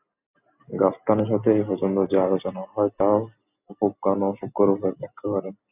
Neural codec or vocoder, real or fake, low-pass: none; real; 3.6 kHz